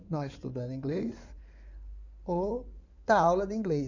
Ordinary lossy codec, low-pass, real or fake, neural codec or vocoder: none; 7.2 kHz; fake; codec, 16 kHz in and 24 kHz out, 2.2 kbps, FireRedTTS-2 codec